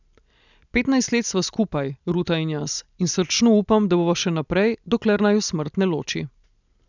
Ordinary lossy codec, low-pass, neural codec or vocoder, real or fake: none; 7.2 kHz; none; real